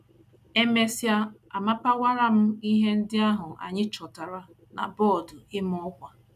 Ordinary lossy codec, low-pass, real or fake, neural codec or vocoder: none; 14.4 kHz; real; none